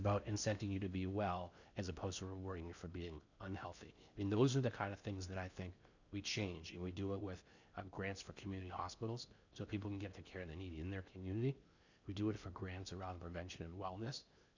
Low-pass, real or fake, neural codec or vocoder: 7.2 kHz; fake; codec, 16 kHz in and 24 kHz out, 0.8 kbps, FocalCodec, streaming, 65536 codes